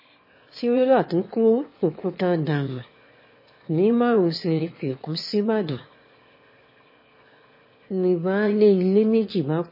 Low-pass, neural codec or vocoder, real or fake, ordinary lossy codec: 5.4 kHz; autoencoder, 22.05 kHz, a latent of 192 numbers a frame, VITS, trained on one speaker; fake; MP3, 24 kbps